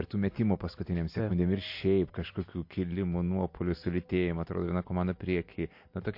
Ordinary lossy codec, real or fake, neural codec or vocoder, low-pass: MP3, 32 kbps; real; none; 5.4 kHz